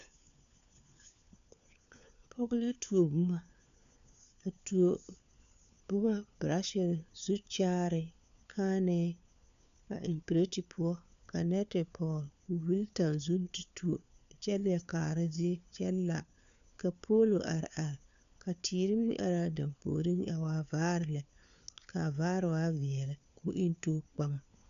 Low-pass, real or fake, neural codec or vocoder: 7.2 kHz; fake; codec, 16 kHz, 4 kbps, FunCodec, trained on LibriTTS, 50 frames a second